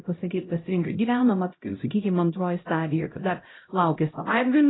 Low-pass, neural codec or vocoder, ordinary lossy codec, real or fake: 7.2 kHz; codec, 16 kHz, 0.5 kbps, X-Codec, HuBERT features, trained on LibriSpeech; AAC, 16 kbps; fake